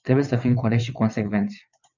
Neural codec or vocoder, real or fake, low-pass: vocoder, 22.05 kHz, 80 mel bands, WaveNeXt; fake; 7.2 kHz